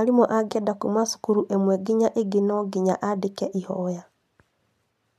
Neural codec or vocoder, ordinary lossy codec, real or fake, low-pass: none; none; real; 14.4 kHz